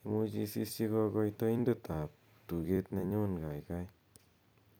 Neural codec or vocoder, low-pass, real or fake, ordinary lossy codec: vocoder, 44.1 kHz, 128 mel bands every 256 samples, BigVGAN v2; none; fake; none